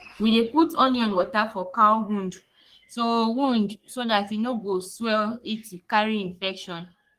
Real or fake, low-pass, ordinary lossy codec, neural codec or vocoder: fake; 14.4 kHz; Opus, 24 kbps; codec, 44.1 kHz, 3.4 kbps, Pupu-Codec